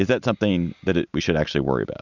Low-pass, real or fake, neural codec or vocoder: 7.2 kHz; real; none